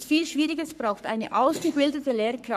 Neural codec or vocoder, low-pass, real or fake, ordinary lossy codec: codec, 44.1 kHz, 3.4 kbps, Pupu-Codec; 14.4 kHz; fake; none